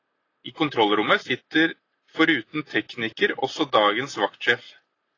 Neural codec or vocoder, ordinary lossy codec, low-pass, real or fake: none; AAC, 32 kbps; 7.2 kHz; real